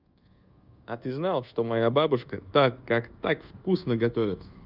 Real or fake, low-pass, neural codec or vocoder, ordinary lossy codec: fake; 5.4 kHz; codec, 24 kHz, 1.2 kbps, DualCodec; Opus, 32 kbps